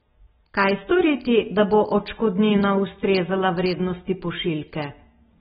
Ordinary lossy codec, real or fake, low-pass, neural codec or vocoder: AAC, 16 kbps; real; 14.4 kHz; none